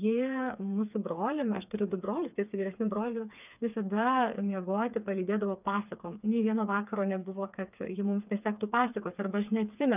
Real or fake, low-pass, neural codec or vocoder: fake; 3.6 kHz; codec, 16 kHz, 4 kbps, FreqCodec, smaller model